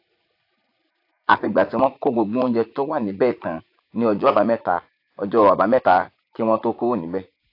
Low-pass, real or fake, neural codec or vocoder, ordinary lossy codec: 5.4 kHz; fake; vocoder, 44.1 kHz, 80 mel bands, Vocos; AAC, 32 kbps